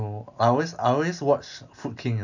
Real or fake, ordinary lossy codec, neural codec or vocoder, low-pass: real; none; none; 7.2 kHz